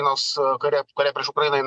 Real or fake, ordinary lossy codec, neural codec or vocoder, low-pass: real; MP3, 64 kbps; none; 9.9 kHz